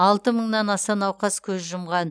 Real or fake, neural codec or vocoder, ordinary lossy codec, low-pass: real; none; none; none